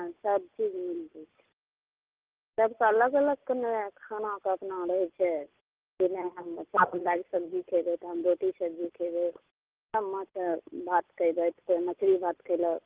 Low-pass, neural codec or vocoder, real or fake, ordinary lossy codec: 3.6 kHz; none; real; Opus, 16 kbps